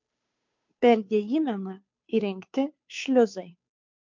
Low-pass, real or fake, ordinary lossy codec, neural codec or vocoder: 7.2 kHz; fake; MP3, 48 kbps; codec, 16 kHz, 2 kbps, FunCodec, trained on Chinese and English, 25 frames a second